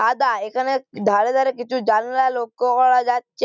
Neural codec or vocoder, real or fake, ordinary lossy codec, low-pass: none; real; none; 7.2 kHz